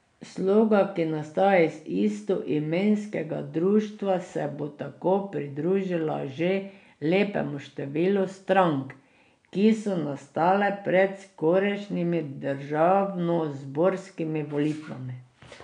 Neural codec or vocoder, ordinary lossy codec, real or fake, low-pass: none; none; real; 9.9 kHz